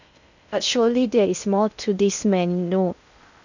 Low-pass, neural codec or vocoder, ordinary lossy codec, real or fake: 7.2 kHz; codec, 16 kHz in and 24 kHz out, 0.6 kbps, FocalCodec, streaming, 2048 codes; none; fake